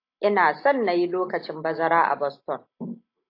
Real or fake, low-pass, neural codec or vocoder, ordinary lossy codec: real; 5.4 kHz; none; AAC, 32 kbps